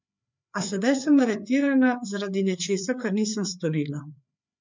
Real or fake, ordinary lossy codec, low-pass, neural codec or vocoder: fake; MP3, 64 kbps; 7.2 kHz; codec, 16 kHz, 4 kbps, FreqCodec, larger model